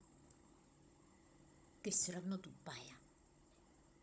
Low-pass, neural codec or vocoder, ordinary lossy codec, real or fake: none; codec, 16 kHz, 16 kbps, FunCodec, trained on Chinese and English, 50 frames a second; none; fake